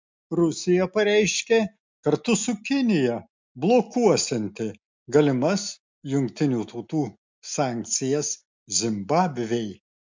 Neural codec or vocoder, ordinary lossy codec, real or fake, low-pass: none; MP3, 64 kbps; real; 7.2 kHz